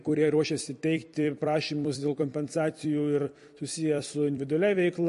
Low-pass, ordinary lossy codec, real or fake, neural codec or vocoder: 14.4 kHz; MP3, 48 kbps; fake; vocoder, 44.1 kHz, 128 mel bands every 256 samples, BigVGAN v2